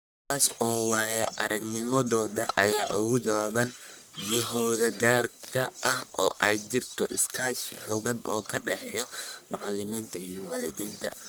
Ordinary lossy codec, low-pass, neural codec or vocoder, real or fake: none; none; codec, 44.1 kHz, 1.7 kbps, Pupu-Codec; fake